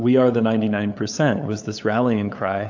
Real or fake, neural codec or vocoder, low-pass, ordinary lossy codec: fake; codec, 16 kHz, 8 kbps, FunCodec, trained on LibriTTS, 25 frames a second; 7.2 kHz; AAC, 48 kbps